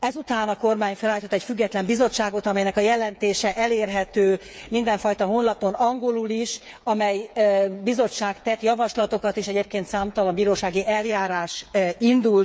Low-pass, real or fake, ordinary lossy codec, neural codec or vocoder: none; fake; none; codec, 16 kHz, 8 kbps, FreqCodec, smaller model